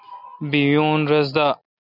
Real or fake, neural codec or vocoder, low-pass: real; none; 5.4 kHz